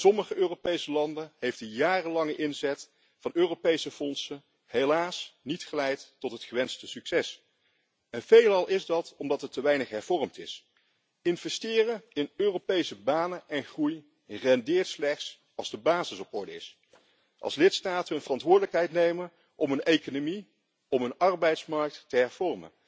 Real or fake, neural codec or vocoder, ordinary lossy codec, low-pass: real; none; none; none